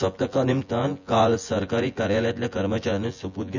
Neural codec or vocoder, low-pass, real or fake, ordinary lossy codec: vocoder, 24 kHz, 100 mel bands, Vocos; 7.2 kHz; fake; none